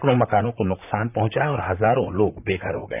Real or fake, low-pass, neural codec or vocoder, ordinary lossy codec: fake; 3.6 kHz; vocoder, 44.1 kHz, 128 mel bands, Pupu-Vocoder; none